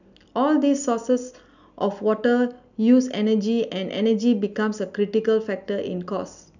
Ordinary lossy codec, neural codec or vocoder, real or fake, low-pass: none; none; real; 7.2 kHz